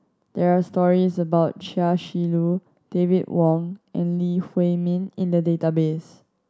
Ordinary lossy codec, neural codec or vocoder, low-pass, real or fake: none; none; none; real